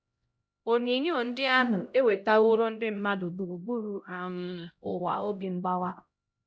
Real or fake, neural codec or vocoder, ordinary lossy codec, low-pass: fake; codec, 16 kHz, 0.5 kbps, X-Codec, HuBERT features, trained on LibriSpeech; none; none